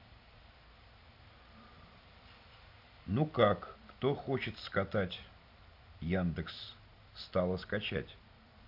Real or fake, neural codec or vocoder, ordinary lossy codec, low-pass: real; none; none; 5.4 kHz